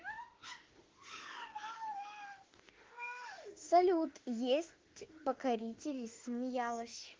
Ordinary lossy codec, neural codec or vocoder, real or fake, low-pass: Opus, 16 kbps; autoencoder, 48 kHz, 32 numbers a frame, DAC-VAE, trained on Japanese speech; fake; 7.2 kHz